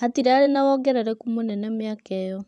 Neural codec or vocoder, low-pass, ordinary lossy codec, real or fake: vocoder, 24 kHz, 100 mel bands, Vocos; 10.8 kHz; none; fake